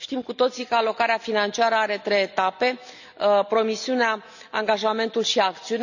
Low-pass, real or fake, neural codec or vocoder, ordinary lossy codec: 7.2 kHz; real; none; none